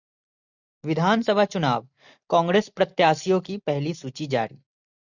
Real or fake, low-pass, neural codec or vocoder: real; 7.2 kHz; none